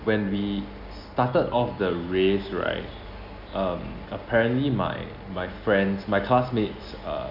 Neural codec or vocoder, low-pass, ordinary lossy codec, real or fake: none; 5.4 kHz; none; real